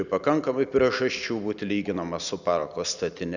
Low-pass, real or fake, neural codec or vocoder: 7.2 kHz; real; none